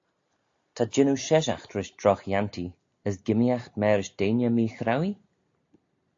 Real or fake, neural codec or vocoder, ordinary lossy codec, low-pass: real; none; AAC, 48 kbps; 7.2 kHz